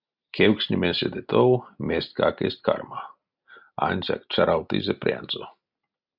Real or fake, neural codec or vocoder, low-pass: real; none; 5.4 kHz